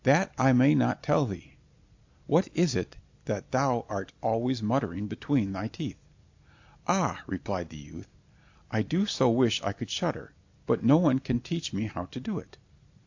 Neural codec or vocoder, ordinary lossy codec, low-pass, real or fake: none; AAC, 48 kbps; 7.2 kHz; real